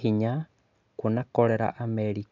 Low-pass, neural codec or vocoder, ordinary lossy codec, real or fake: 7.2 kHz; none; MP3, 64 kbps; real